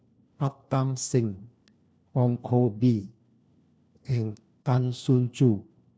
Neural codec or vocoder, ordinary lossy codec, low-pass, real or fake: codec, 16 kHz, 1 kbps, FunCodec, trained on LibriTTS, 50 frames a second; none; none; fake